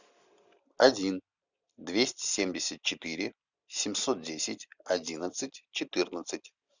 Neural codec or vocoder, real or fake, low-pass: none; real; 7.2 kHz